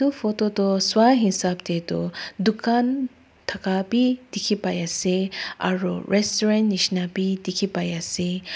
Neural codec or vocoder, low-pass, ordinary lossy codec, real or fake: none; none; none; real